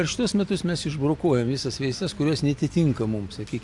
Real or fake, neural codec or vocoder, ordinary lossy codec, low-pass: real; none; AAC, 64 kbps; 10.8 kHz